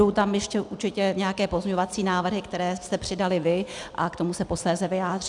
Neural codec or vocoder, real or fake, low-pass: none; real; 10.8 kHz